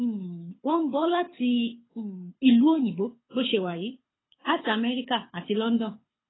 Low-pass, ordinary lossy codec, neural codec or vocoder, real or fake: 7.2 kHz; AAC, 16 kbps; codec, 24 kHz, 6 kbps, HILCodec; fake